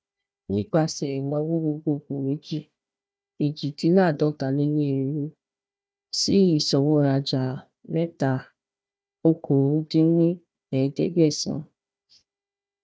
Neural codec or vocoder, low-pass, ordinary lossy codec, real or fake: codec, 16 kHz, 1 kbps, FunCodec, trained on Chinese and English, 50 frames a second; none; none; fake